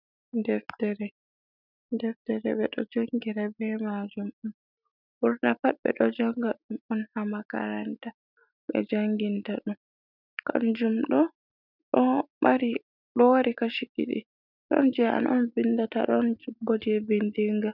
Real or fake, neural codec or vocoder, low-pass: real; none; 5.4 kHz